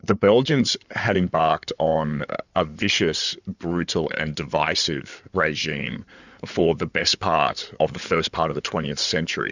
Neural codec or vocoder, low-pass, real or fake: codec, 16 kHz in and 24 kHz out, 2.2 kbps, FireRedTTS-2 codec; 7.2 kHz; fake